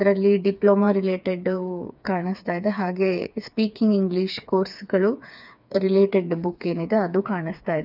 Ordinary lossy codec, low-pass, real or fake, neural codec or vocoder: none; 5.4 kHz; fake; codec, 16 kHz, 4 kbps, FreqCodec, smaller model